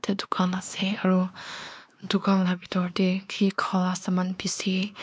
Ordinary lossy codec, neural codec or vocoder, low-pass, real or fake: none; codec, 16 kHz, 2 kbps, X-Codec, WavLM features, trained on Multilingual LibriSpeech; none; fake